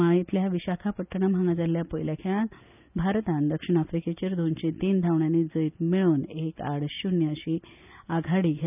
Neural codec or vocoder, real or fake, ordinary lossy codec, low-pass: none; real; none; 3.6 kHz